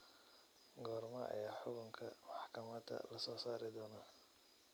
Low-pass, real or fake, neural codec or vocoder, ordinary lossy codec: none; real; none; none